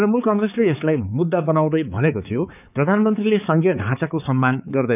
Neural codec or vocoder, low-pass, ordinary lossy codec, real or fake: codec, 16 kHz, 4 kbps, X-Codec, HuBERT features, trained on balanced general audio; 3.6 kHz; none; fake